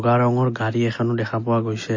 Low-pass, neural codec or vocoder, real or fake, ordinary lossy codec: 7.2 kHz; none; real; MP3, 32 kbps